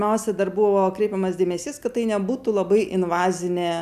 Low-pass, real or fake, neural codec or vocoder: 14.4 kHz; real; none